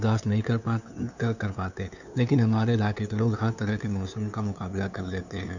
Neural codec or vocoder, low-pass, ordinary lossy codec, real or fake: codec, 16 kHz, 2 kbps, FunCodec, trained on LibriTTS, 25 frames a second; 7.2 kHz; none; fake